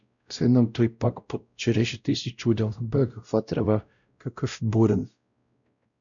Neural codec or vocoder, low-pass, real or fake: codec, 16 kHz, 0.5 kbps, X-Codec, WavLM features, trained on Multilingual LibriSpeech; 7.2 kHz; fake